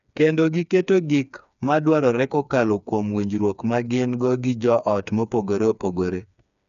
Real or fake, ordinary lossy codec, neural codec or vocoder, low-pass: fake; none; codec, 16 kHz, 4 kbps, FreqCodec, smaller model; 7.2 kHz